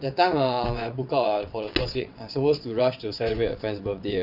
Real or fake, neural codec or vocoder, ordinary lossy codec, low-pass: fake; vocoder, 22.05 kHz, 80 mel bands, WaveNeXt; AAC, 48 kbps; 5.4 kHz